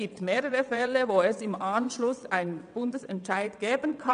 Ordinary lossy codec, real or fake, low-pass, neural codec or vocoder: none; fake; 9.9 kHz; vocoder, 22.05 kHz, 80 mel bands, WaveNeXt